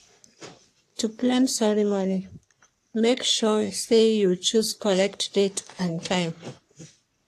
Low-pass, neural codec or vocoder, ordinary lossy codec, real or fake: 14.4 kHz; codec, 44.1 kHz, 3.4 kbps, Pupu-Codec; AAC, 64 kbps; fake